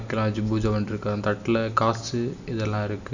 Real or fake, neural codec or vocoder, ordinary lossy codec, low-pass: real; none; none; 7.2 kHz